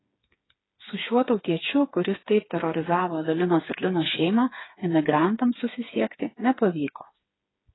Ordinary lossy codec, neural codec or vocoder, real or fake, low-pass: AAC, 16 kbps; codec, 16 kHz, 4 kbps, FreqCodec, smaller model; fake; 7.2 kHz